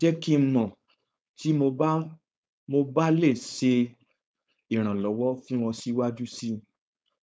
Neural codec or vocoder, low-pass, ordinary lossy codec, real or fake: codec, 16 kHz, 4.8 kbps, FACodec; none; none; fake